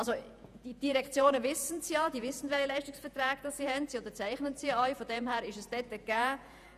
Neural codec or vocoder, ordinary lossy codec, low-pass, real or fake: vocoder, 48 kHz, 128 mel bands, Vocos; none; 14.4 kHz; fake